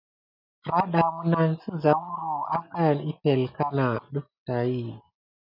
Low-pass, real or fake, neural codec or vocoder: 5.4 kHz; real; none